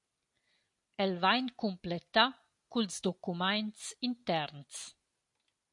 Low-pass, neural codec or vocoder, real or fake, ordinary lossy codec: 10.8 kHz; none; real; MP3, 48 kbps